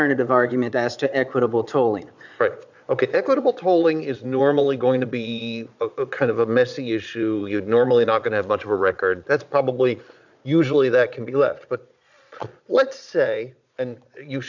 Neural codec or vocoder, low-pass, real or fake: vocoder, 22.05 kHz, 80 mel bands, Vocos; 7.2 kHz; fake